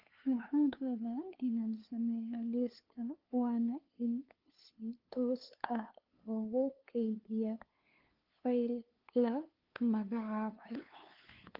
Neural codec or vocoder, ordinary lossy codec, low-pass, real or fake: codec, 16 kHz, 2 kbps, FunCodec, trained on LibriTTS, 25 frames a second; Opus, 32 kbps; 5.4 kHz; fake